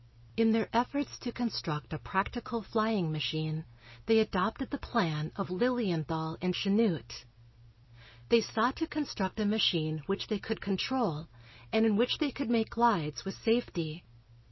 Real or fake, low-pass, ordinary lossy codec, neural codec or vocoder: real; 7.2 kHz; MP3, 24 kbps; none